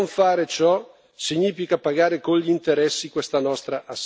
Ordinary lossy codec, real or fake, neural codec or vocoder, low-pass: none; real; none; none